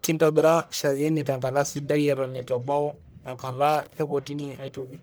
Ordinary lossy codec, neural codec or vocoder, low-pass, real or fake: none; codec, 44.1 kHz, 1.7 kbps, Pupu-Codec; none; fake